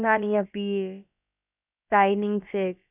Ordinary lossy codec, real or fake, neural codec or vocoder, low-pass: none; fake; codec, 16 kHz, about 1 kbps, DyCAST, with the encoder's durations; 3.6 kHz